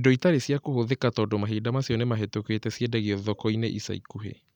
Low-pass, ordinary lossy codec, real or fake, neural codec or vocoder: 19.8 kHz; none; real; none